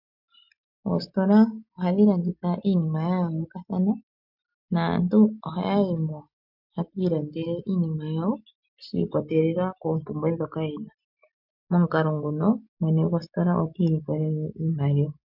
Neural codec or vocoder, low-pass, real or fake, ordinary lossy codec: none; 5.4 kHz; real; MP3, 48 kbps